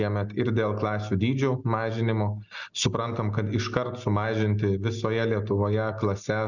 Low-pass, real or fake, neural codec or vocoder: 7.2 kHz; real; none